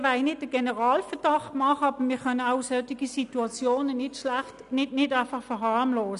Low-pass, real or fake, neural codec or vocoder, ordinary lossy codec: 10.8 kHz; real; none; none